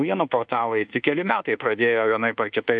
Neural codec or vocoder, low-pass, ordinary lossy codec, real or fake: codec, 24 kHz, 1.2 kbps, DualCodec; 9.9 kHz; MP3, 96 kbps; fake